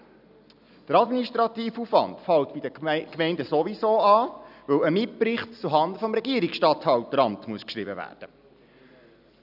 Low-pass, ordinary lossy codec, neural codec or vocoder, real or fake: 5.4 kHz; none; none; real